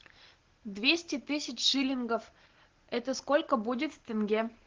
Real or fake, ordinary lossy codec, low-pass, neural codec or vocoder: real; Opus, 16 kbps; 7.2 kHz; none